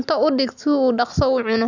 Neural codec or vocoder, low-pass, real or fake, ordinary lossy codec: none; 7.2 kHz; real; none